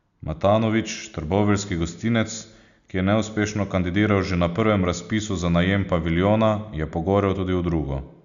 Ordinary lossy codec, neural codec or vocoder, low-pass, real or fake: MP3, 96 kbps; none; 7.2 kHz; real